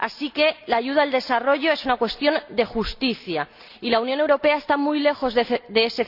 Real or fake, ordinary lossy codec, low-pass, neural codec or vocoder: real; Opus, 64 kbps; 5.4 kHz; none